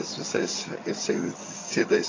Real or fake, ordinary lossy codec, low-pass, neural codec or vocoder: fake; AAC, 32 kbps; 7.2 kHz; vocoder, 22.05 kHz, 80 mel bands, HiFi-GAN